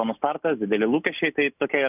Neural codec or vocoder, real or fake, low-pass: none; real; 3.6 kHz